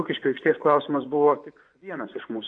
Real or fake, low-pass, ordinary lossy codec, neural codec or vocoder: fake; 9.9 kHz; AAC, 48 kbps; vocoder, 24 kHz, 100 mel bands, Vocos